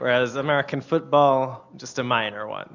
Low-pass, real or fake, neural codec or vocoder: 7.2 kHz; real; none